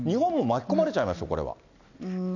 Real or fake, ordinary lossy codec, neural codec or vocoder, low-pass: real; none; none; 7.2 kHz